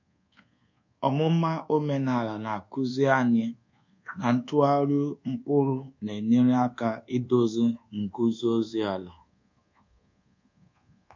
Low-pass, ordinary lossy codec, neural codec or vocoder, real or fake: 7.2 kHz; MP3, 48 kbps; codec, 24 kHz, 1.2 kbps, DualCodec; fake